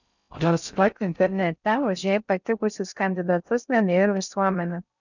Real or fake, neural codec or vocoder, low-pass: fake; codec, 16 kHz in and 24 kHz out, 0.6 kbps, FocalCodec, streaming, 4096 codes; 7.2 kHz